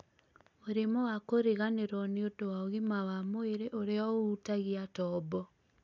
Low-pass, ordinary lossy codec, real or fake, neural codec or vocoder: 7.2 kHz; none; real; none